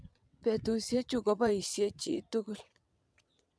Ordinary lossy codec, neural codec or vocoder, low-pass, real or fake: none; vocoder, 22.05 kHz, 80 mel bands, WaveNeXt; none; fake